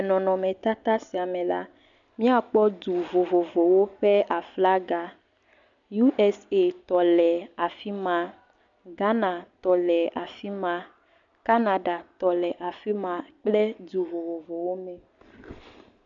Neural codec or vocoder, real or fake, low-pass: none; real; 7.2 kHz